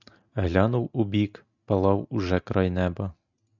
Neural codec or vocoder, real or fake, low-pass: none; real; 7.2 kHz